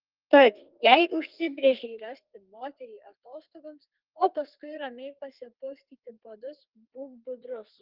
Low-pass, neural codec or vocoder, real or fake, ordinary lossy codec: 5.4 kHz; codec, 32 kHz, 1.9 kbps, SNAC; fake; Opus, 32 kbps